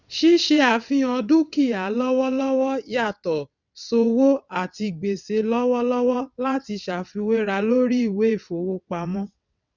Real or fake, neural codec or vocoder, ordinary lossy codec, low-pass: fake; vocoder, 22.05 kHz, 80 mel bands, WaveNeXt; none; 7.2 kHz